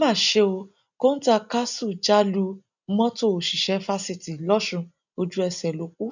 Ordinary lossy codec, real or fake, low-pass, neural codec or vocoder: none; real; 7.2 kHz; none